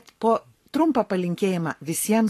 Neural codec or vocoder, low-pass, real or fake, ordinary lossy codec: codec, 44.1 kHz, 7.8 kbps, Pupu-Codec; 14.4 kHz; fake; AAC, 48 kbps